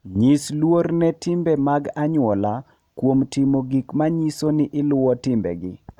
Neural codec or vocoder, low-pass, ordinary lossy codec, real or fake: none; 19.8 kHz; none; real